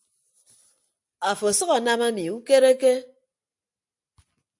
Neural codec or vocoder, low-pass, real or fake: none; 10.8 kHz; real